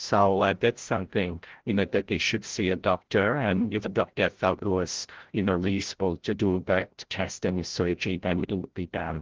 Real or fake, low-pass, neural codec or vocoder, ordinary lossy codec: fake; 7.2 kHz; codec, 16 kHz, 0.5 kbps, FreqCodec, larger model; Opus, 16 kbps